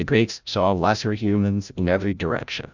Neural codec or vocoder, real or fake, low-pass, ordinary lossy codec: codec, 16 kHz, 0.5 kbps, FreqCodec, larger model; fake; 7.2 kHz; Opus, 64 kbps